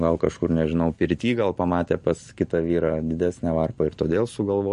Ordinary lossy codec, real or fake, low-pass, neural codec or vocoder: MP3, 48 kbps; real; 14.4 kHz; none